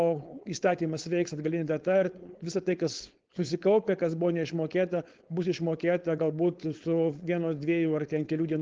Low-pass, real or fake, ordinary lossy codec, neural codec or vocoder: 7.2 kHz; fake; Opus, 16 kbps; codec, 16 kHz, 4.8 kbps, FACodec